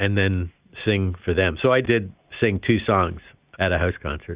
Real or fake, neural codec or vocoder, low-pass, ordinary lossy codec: real; none; 3.6 kHz; Opus, 64 kbps